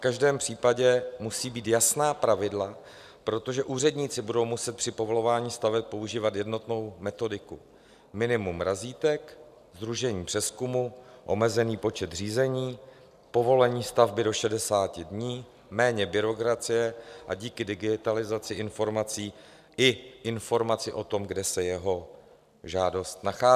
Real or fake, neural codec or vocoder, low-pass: real; none; 14.4 kHz